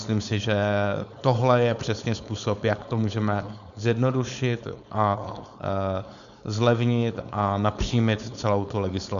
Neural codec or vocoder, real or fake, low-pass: codec, 16 kHz, 4.8 kbps, FACodec; fake; 7.2 kHz